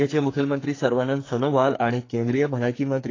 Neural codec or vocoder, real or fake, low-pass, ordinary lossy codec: codec, 44.1 kHz, 2.6 kbps, SNAC; fake; 7.2 kHz; AAC, 32 kbps